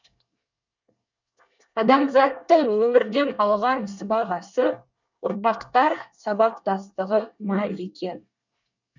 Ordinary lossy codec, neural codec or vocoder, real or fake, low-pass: none; codec, 24 kHz, 1 kbps, SNAC; fake; 7.2 kHz